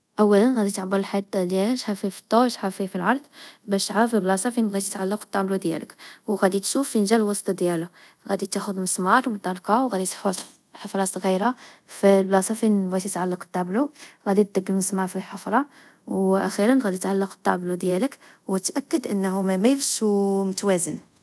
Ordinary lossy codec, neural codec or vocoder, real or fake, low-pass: none; codec, 24 kHz, 0.5 kbps, DualCodec; fake; none